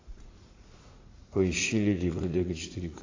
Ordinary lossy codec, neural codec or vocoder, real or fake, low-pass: AAC, 32 kbps; vocoder, 44.1 kHz, 80 mel bands, Vocos; fake; 7.2 kHz